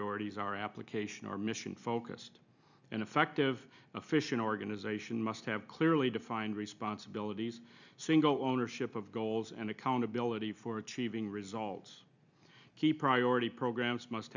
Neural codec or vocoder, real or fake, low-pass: none; real; 7.2 kHz